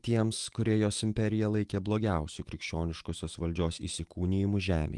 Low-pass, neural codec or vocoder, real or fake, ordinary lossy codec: 10.8 kHz; autoencoder, 48 kHz, 128 numbers a frame, DAC-VAE, trained on Japanese speech; fake; Opus, 24 kbps